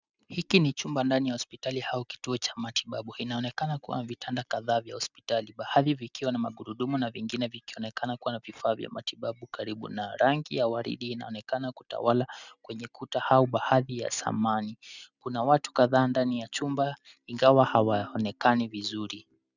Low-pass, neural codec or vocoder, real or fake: 7.2 kHz; none; real